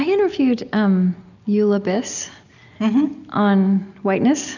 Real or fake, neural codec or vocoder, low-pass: real; none; 7.2 kHz